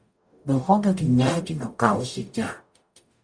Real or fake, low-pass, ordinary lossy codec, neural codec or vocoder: fake; 9.9 kHz; Opus, 64 kbps; codec, 44.1 kHz, 0.9 kbps, DAC